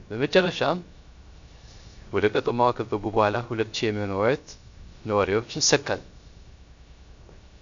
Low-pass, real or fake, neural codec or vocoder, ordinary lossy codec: 7.2 kHz; fake; codec, 16 kHz, 0.3 kbps, FocalCodec; MP3, 64 kbps